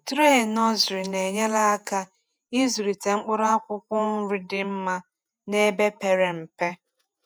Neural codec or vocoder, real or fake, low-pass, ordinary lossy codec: vocoder, 48 kHz, 128 mel bands, Vocos; fake; none; none